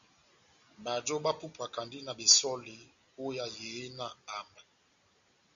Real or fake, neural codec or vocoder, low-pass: real; none; 7.2 kHz